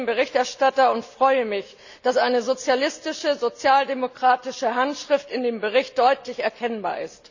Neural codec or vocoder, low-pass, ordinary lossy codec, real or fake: none; 7.2 kHz; none; real